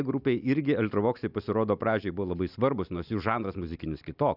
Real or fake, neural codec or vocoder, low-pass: real; none; 5.4 kHz